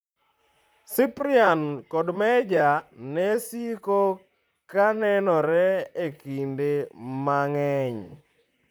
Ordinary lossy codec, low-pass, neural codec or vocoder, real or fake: none; none; vocoder, 44.1 kHz, 128 mel bands, Pupu-Vocoder; fake